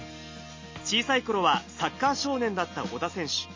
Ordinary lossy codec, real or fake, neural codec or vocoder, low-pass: MP3, 32 kbps; real; none; 7.2 kHz